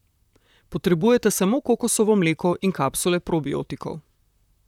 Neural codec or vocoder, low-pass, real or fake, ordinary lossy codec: vocoder, 44.1 kHz, 128 mel bands, Pupu-Vocoder; 19.8 kHz; fake; none